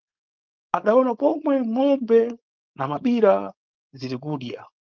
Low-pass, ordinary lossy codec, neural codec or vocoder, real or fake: 7.2 kHz; Opus, 24 kbps; codec, 16 kHz, 4.8 kbps, FACodec; fake